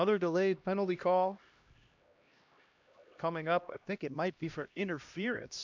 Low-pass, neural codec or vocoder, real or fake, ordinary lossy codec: 7.2 kHz; codec, 16 kHz, 1 kbps, X-Codec, HuBERT features, trained on LibriSpeech; fake; MP3, 64 kbps